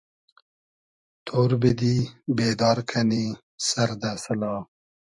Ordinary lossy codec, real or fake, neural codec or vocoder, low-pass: MP3, 96 kbps; fake; vocoder, 44.1 kHz, 128 mel bands every 512 samples, BigVGAN v2; 10.8 kHz